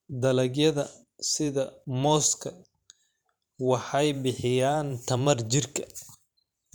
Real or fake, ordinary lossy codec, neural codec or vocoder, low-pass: fake; none; vocoder, 44.1 kHz, 128 mel bands every 512 samples, BigVGAN v2; none